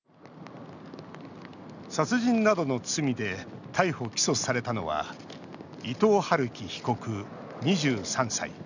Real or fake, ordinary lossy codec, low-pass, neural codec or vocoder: real; none; 7.2 kHz; none